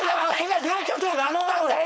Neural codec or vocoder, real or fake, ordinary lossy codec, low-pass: codec, 16 kHz, 4.8 kbps, FACodec; fake; none; none